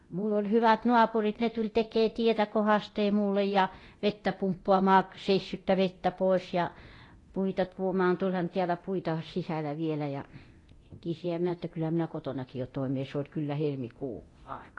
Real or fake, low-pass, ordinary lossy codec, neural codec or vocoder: fake; 10.8 kHz; AAC, 32 kbps; codec, 24 kHz, 0.9 kbps, DualCodec